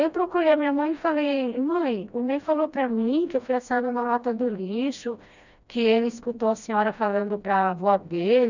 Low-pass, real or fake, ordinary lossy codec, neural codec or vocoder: 7.2 kHz; fake; none; codec, 16 kHz, 1 kbps, FreqCodec, smaller model